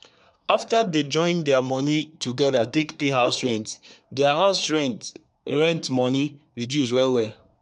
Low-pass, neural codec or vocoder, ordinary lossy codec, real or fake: 10.8 kHz; codec, 24 kHz, 1 kbps, SNAC; none; fake